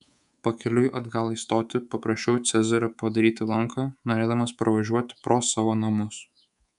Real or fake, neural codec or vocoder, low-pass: fake; codec, 24 kHz, 3.1 kbps, DualCodec; 10.8 kHz